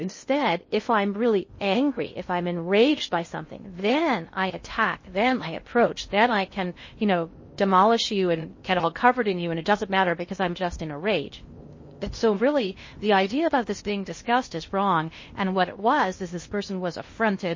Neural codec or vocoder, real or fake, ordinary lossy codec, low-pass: codec, 16 kHz in and 24 kHz out, 0.6 kbps, FocalCodec, streaming, 2048 codes; fake; MP3, 32 kbps; 7.2 kHz